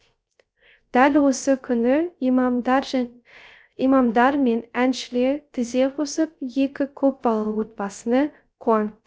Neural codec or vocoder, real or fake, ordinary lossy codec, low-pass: codec, 16 kHz, 0.3 kbps, FocalCodec; fake; none; none